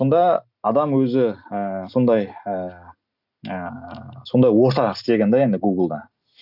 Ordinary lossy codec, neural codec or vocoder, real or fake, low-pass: none; none; real; 5.4 kHz